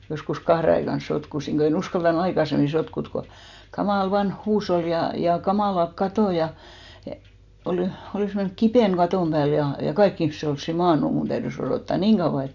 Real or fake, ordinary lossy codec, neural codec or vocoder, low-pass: real; none; none; 7.2 kHz